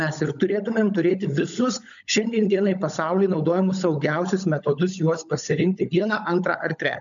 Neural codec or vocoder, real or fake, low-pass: codec, 16 kHz, 16 kbps, FunCodec, trained on LibriTTS, 50 frames a second; fake; 7.2 kHz